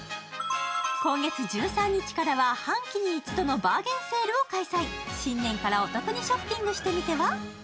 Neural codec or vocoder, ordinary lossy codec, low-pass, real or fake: none; none; none; real